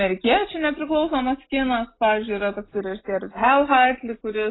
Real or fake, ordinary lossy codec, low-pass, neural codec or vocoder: real; AAC, 16 kbps; 7.2 kHz; none